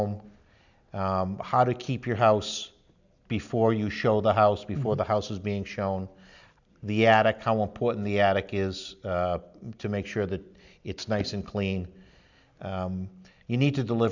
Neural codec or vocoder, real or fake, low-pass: none; real; 7.2 kHz